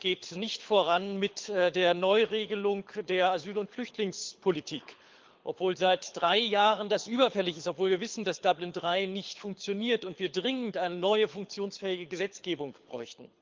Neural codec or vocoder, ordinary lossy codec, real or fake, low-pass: codec, 24 kHz, 6 kbps, HILCodec; Opus, 32 kbps; fake; 7.2 kHz